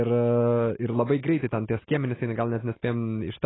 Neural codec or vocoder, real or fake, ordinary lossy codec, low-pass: none; real; AAC, 16 kbps; 7.2 kHz